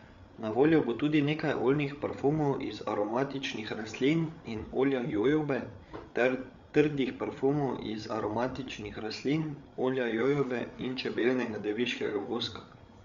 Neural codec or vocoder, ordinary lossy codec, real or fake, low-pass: codec, 16 kHz, 8 kbps, FreqCodec, larger model; Opus, 64 kbps; fake; 7.2 kHz